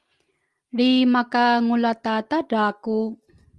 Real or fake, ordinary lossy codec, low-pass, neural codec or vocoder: real; Opus, 24 kbps; 10.8 kHz; none